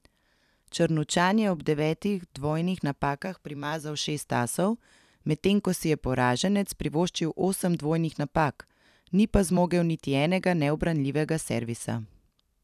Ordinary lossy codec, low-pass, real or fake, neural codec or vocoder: none; 14.4 kHz; fake; vocoder, 44.1 kHz, 128 mel bands every 256 samples, BigVGAN v2